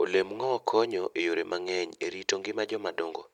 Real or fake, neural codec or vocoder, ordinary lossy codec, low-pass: real; none; none; 19.8 kHz